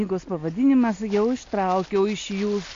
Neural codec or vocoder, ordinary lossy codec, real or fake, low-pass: none; MP3, 48 kbps; real; 7.2 kHz